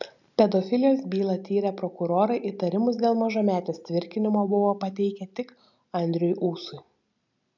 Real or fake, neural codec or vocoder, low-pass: real; none; 7.2 kHz